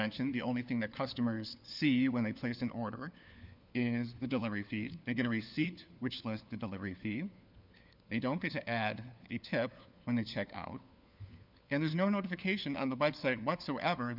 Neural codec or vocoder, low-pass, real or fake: codec, 16 kHz, 4 kbps, FunCodec, trained on LibriTTS, 50 frames a second; 5.4 kHz; fake